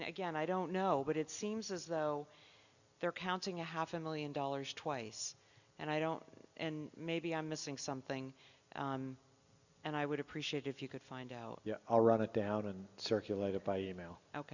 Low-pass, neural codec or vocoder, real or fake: 7.2 kHz; none; real